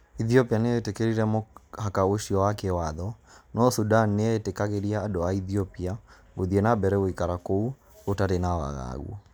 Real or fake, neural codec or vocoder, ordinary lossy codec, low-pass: real; none; none; none